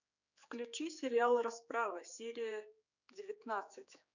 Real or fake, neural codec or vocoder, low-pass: fake; codec, 16 kHz, 4 kbps, X-Codec, HuBERT features, trained on general audio; 7.2 kHz